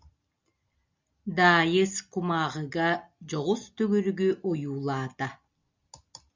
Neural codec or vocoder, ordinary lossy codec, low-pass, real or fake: none; MP3, 64 kbps; 7.2 kHz; real